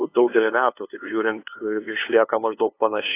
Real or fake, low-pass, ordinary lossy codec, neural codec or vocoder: fake; 3.6 kHz; AAC, 24 kbps; codec, 16 kHz, 2 kbps, FunCodec, trained on LibriTTS, 25 frames a second